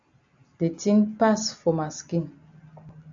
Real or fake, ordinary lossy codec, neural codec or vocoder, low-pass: real; AAC, 64 kbps; none; 7.2 kHz